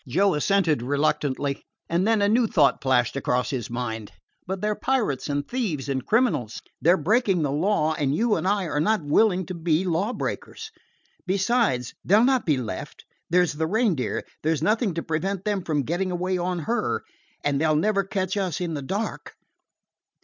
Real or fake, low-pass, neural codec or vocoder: real; 7.2 kHz; none